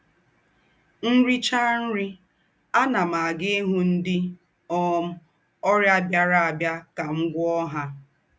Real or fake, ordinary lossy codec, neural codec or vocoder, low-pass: real; none; none; none